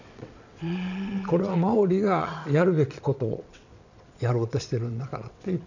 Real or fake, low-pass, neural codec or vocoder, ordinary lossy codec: fake; 7.2 kHz; vocoder, 22.05 kHz, 80 mel bands, WaveNeXt; none